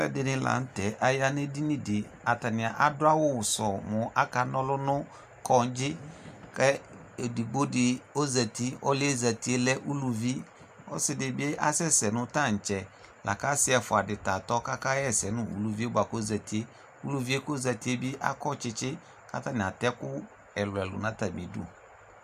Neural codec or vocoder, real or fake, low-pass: none; real; 14.4 kHz